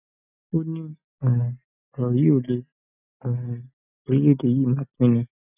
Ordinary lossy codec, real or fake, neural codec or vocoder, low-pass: none; real; none; 3.6 kHz